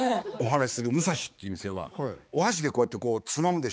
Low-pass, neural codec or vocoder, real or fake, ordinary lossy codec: none; codec, 16 kHz, 4 kbps, X-Codec, HuBERT features, trained on balanced general audio; fake; none